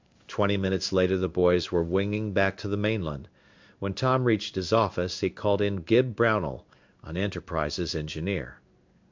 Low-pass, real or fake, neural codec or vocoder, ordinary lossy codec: 7.2 kHz; fake; codec, 16 kHz in and 24 kHz out, 1 kbps, XY-Tokenizer; MP3, 64 kbps